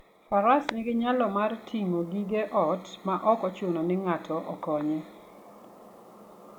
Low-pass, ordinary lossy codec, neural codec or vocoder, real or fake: 19.8 kHz; none; none; real